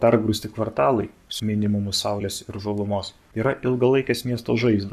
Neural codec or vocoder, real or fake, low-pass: codec, 44.1 kHz, 7.8 kbps, Pupu-Codec; fake; 14.4 kHz